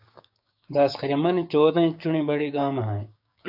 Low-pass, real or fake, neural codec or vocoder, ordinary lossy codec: 5.4 kHz; fake; codec, 16 kHz, 6 kbps, DAC; AAC, 48 kbps